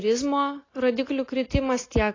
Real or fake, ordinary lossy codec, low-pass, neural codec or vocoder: real; AAC, 32 kbps; 7.2 kHz; none